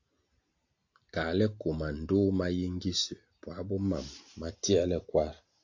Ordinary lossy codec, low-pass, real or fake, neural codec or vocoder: AAC, 48 kbps; 7.2 kHz; real; none